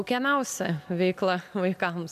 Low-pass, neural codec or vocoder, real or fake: 14.4 kHz; none; real